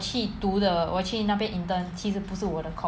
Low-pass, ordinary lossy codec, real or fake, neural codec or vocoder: none; none; real; none